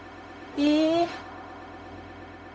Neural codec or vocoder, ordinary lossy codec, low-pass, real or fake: codec, 16 kHz, 0.4 kbps, LongCat-Audio-Codec; none; none; fake